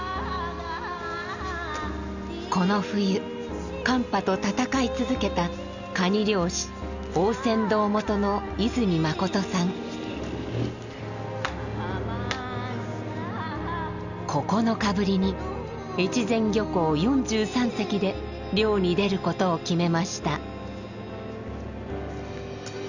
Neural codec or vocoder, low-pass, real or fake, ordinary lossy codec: none; 7.2 kHz; real; none